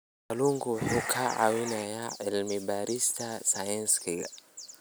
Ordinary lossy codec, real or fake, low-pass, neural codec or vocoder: none; real; none; none